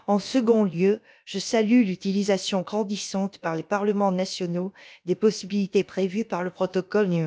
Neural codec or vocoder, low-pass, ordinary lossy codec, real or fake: codec, 16 kHz, about 1 kbps, DyCAST, with the encoder's durations; none; none; fake